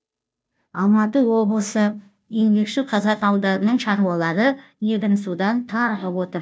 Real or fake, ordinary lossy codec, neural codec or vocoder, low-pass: fake; none; codec, 16 kHz, 0.5 kbps, FunCodec, trained on Chinese and English, 25 frames a second; none